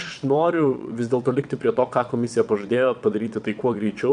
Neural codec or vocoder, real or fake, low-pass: vocoder, 22.05 kHz, 80 mel bands, WaveNeXt; fake; 9.9 kHz